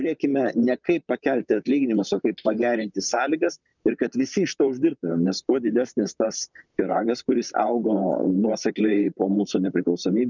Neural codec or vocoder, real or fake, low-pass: vocoder, 44.1 kHz, 128 mel bands, Pupu-Vocoder; fake; 7.2 kHz